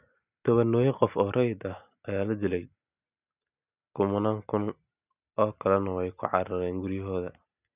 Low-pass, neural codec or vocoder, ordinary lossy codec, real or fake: 3.6 kHz; none; none; real